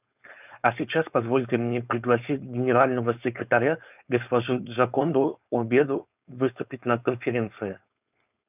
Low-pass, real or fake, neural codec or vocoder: 3.6 kHz; fake; codec, 16 kHz, 4.8 kbps, FACodec